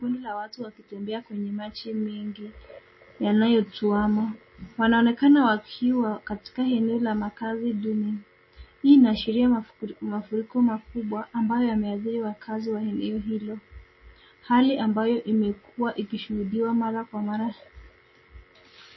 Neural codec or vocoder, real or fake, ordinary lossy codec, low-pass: none; real; MP3, 24 kbps; 7.2 kHz